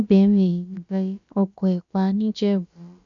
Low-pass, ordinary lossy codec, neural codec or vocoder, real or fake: 7.2 kHz; MP3, 64 kbps; codec, 16 kHz, about 1 kbps, DyCAST, with the encoder's durations; fake